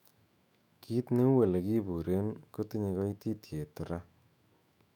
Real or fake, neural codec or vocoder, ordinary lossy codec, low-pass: fake; autoencoder, 48 kHz, 128 numbers a frame, DAC-VAE, trained on Japanese speech; none; 19.8 kHz